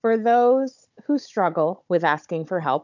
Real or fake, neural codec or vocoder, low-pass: fake; codec, 16 kHz, 16 kbps, FunCodec, trained on Chinese and English, 50 frames a second; 7.2 kHz